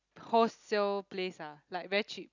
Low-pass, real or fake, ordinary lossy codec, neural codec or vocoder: 7.2 kHz; real; none; none